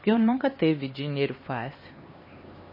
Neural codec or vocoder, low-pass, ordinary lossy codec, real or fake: codec, 16 kHz, 2 kbps, X-Codec, HuBERT features, trained on LibriSpeech; 5.4 kHz; MP3, 24 kbps; fake